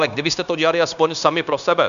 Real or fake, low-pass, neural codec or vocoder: fake; 7.2 kHz; codec, 16 kHz, 0.9 kbps, LongCat-Audio-Codec